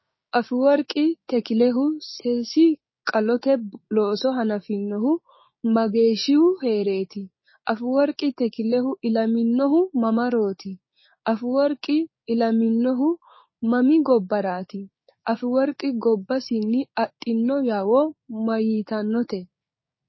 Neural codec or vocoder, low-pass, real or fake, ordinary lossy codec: codec, 16 kHz, 6 kbps, DAC; 7.2 kHz; fake; MP3, 24 kbps